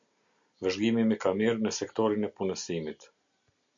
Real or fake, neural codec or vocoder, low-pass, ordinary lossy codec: real; none; 7.2 kHz; MP3, 64 kbps